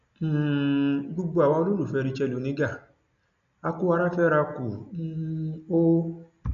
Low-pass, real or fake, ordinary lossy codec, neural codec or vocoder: 7.2 kHz; real; none; none